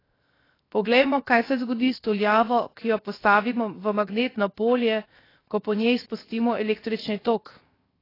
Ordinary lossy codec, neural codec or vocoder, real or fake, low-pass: AAC, 24 kbps; codec, 16 kHz, 0.7 kbps, FocalCodec; fake; 5.4 kHz